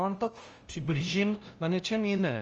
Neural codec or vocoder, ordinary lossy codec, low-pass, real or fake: codec, 16 kHz, 0.5 kbps, FunCodec, trained on LibriTTS, 25 frames a second; Opus, 24 kbps; 7.2 kHz; fake